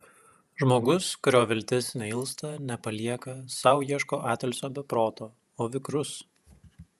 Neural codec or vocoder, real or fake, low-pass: vocoder, 48 kHz, 128 mel bands, Vocos; fake; 14.4 kHz